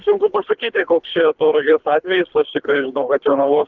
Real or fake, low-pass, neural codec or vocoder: fake; 7.2 kHz; codec, 24 kHz, 3 kbps, HILCodec